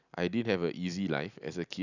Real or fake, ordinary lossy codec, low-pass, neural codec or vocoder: real; none; 7.2 kHz; none